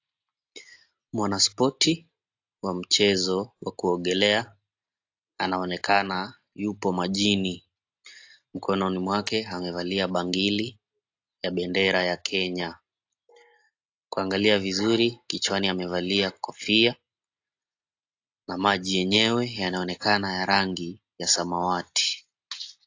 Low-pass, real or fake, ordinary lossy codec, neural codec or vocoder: 7.2 kHz; real; AAC, 48 kbps; none